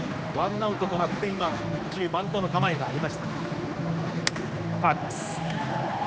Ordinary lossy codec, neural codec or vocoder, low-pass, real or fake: none; codec, 16 kHz, 2 kbps, X-Codec, HuBERT features, trained on general audio; none; fake